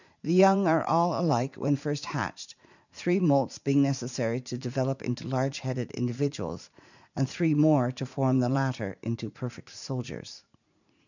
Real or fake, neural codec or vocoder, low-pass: fake; vocoder, 44.1 kHz, 80 mel bands, Vocos; 7.2 kHz